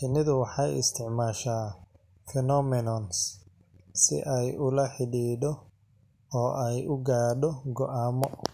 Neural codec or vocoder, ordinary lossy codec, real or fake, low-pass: none; none; real; 14.4 kHz